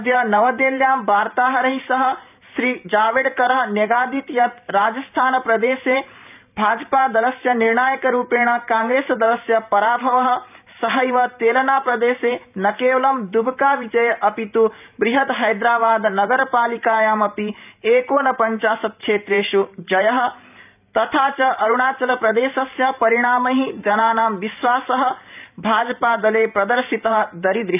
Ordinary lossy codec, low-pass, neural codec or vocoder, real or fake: none; 3.6 kHz; vocoder, 44.1 kHz, 128 mel bands every 512 samples, BigVGAN v2; fake